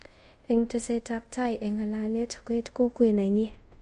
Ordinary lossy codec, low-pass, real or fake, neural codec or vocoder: MP3, 48 kbps; 10.8 kHz; fake; codec, 24 kHz, 0.5 kbps, DualCodec